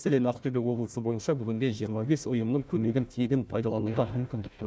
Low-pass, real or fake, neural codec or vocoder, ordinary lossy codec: none; fake; codec, 16 kHz, 1 kbps, FunCodec, trained on Chinese and English, 50 frames a second; none